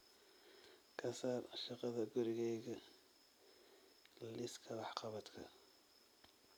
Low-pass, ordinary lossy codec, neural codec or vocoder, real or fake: none; none; none; real